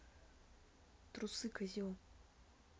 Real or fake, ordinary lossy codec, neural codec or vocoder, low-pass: real; none; none; none